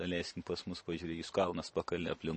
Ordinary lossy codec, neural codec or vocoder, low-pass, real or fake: MP3, 32 kbps; none; 9.9 kHz; real